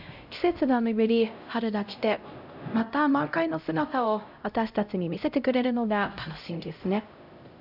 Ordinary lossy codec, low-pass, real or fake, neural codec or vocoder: none; 5.4 kHz; fake; codec, 16 kHz, 0.5 kbps, X-Codec, HuBERT features, trained on LibriSpeech